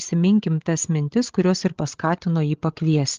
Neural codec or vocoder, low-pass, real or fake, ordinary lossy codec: codec, 16 kHz, 16 kbps, FreqCodec, larger model; 7.2 kHz; fake; Opus, 16 kbps